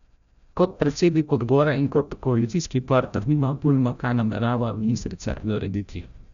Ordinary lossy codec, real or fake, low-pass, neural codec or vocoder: none; fake; 7.2 kHz; codec, 16 kHz, 0.5 kbps, FreqCodec, larger model